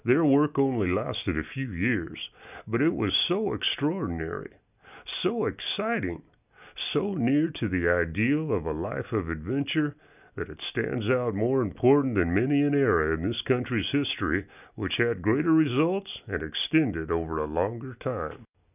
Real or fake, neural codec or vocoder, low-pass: real; none; 3.6 kHz